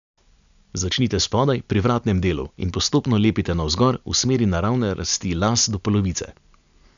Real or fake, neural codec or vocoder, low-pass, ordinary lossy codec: real; none; 7.2 kHz; AAC, 96 kbps